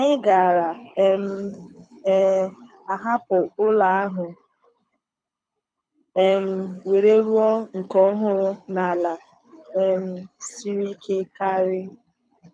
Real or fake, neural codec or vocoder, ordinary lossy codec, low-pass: fake; codec, 24 kHz, 6 kbps, HILCodec; none; 9.9 kHz